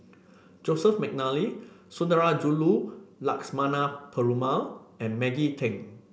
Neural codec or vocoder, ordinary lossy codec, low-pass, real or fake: none; none; none; real